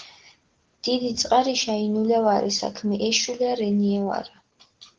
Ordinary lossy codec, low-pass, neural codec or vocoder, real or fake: Opus, 16 kbps; 7.2 kHz; none; real